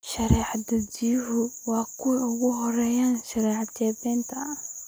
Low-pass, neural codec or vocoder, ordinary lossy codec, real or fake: none; none; none; real